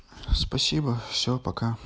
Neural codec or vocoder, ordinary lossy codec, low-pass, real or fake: none; none; none; real